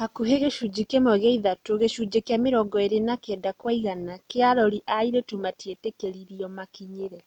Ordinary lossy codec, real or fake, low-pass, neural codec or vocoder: MP3, 96 kbps; real; 19.8 kHz; none